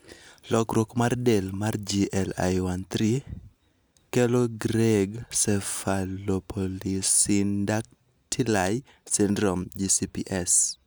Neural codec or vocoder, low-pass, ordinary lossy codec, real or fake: none; none; none; real